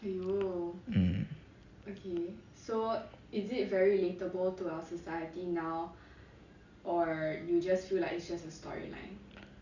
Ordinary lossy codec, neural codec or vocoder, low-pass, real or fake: Opus, 64 kbps; none; 7.2 kHz; real